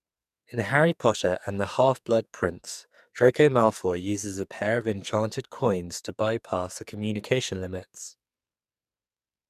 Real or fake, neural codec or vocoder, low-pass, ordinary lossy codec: fake; codec, 44.1 kHz, 2.6 kbps, SNAC; 14.4 kHz; none